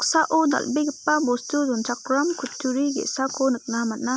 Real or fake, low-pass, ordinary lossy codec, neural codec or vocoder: real; none; none; none